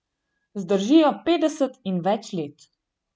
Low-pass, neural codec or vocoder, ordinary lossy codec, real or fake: none; none; none; real